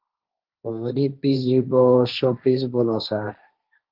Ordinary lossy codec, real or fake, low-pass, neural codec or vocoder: Opus, 32 kbps; fake; 5.4 kHz; codec, 16 kHz, 1.1 kbps, Voila-Tokenizer